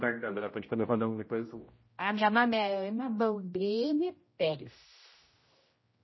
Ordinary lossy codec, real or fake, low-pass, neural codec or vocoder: MP3, 24 kbps; fake; 7.2 kHz; codec, 16 kHz, 0.5 kbps, X-Codec, HuBERT features, trained on general audio